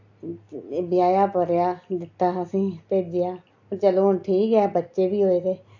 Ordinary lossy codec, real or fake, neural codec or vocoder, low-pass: AAC, 48 kbps; real; none; 7.2 kHz